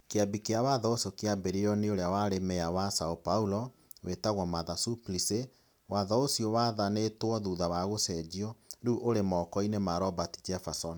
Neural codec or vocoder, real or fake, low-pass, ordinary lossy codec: none; real; none; none